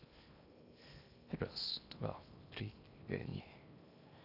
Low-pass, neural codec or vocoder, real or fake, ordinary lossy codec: 5.4 kHz; codec, 16 kHz in and 24 kHz out, 0.8 kbps, FocalCodec, streaming, 65536 codes; fake; none